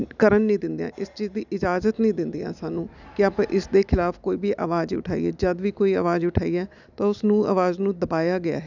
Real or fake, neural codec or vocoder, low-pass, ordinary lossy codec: real; none; 7.2 kHz; none